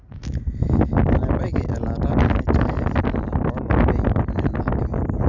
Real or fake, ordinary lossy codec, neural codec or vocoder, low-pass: real; none; none; 7.2 kHz